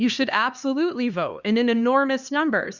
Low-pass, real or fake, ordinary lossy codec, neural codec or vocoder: 7.2 kHz; fake; Opus, 64 kbps; codec, 16 kHz, 2 kbps, X-Codec, HuBERT features, trained on LibriSpeech